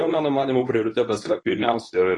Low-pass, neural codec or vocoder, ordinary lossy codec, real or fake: 10.8 kHz; codec, 24 kHz, 0.9 kbps, WavTokenizer, medium speech release version 2; AAC, 32 kbps; fake